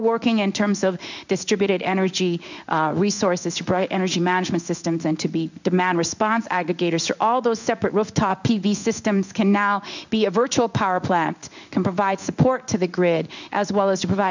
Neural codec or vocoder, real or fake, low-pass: codec, 16 kHz in and 24 kHz out, 1 kbps, XY-Tokenizer; fake; 7.2 kHz